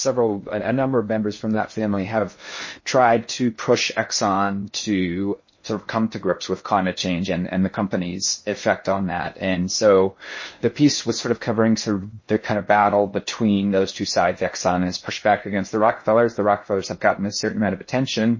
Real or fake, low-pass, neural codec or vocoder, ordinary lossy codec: fake; 7.2 kHz; codec, 16 kHz in and 24 kHz out, 0.6 kbps, FocalCodec, streaming, 2048 codes; MP3, 32 kbps